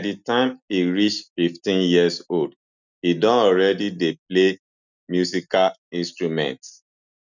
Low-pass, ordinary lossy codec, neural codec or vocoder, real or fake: 7.2 kHz; none; none; real